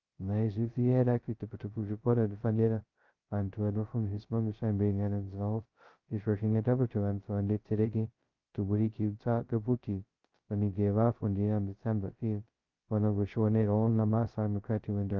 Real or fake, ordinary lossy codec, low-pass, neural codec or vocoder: fake; Opus, 32 kbps; 7.2 kHz; codec, 16 kHz, 0.2 kbps, FocalCodec